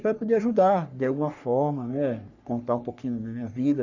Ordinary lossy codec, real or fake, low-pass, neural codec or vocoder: none; fake; 7.2 kHz; codec, 44.1 kHz, 3.4 kbps, Pupu-Codec